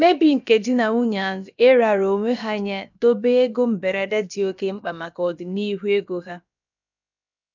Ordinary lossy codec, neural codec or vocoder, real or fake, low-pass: none; codec, 16 kHz, about 1 kbps, DyCAST, with the encoder's durations; fake; 7.2 kHz